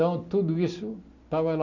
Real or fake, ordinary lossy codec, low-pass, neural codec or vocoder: real; none; 7.2 kHz; none